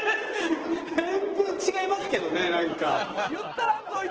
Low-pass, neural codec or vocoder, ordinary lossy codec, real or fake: 7.2 kHz; vocoder, 44.1 kHz, 128 mel bands every 512 samples, BigVGAN v2; Opus, 16 kbps; fake